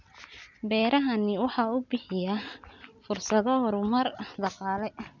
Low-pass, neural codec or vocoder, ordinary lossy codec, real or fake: 7.2 kHz; none; none; real